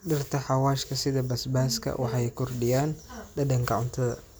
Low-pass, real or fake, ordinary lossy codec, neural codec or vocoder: none; real; none; none